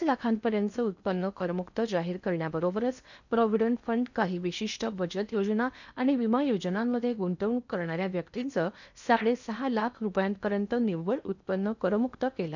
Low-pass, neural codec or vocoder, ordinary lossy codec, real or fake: 7.2 kHz; codec, 16 kHz in and 24 kHz out, 0.8 kbps, FocalCodec, streaming, 65536 codes; none; fake